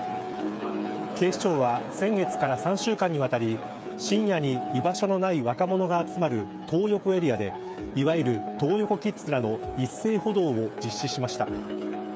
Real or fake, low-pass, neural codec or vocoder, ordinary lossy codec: fake; none; codec, 16 kHz, 8 kbps, FreqCodec, smaller model; none